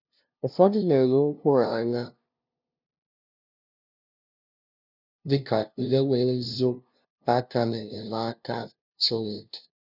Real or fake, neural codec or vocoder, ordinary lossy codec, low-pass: fake; codec, 16 kHz, 0.5 kbps, FunCodec, trained on LibriTTS, 25 frames a second; none; 5.4 kHz